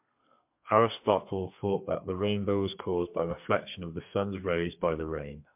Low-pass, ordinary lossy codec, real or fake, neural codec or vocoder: 3.6 kHz; MP3, 32 kbps; fake; codec, 32 kHz, 1.9 kbps, SNAC